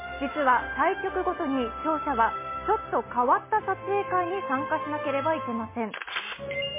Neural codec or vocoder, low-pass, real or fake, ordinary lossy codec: none; 3.6 kHz; real; MP3, 16 kbps